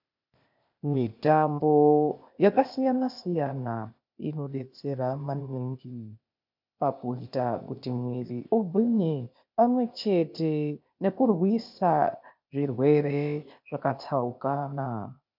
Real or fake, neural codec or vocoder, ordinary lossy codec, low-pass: fake; codec, 16 kHz, 0.8 kbps, ZipCodec; MP3, 48 kbps; 5.4 kHz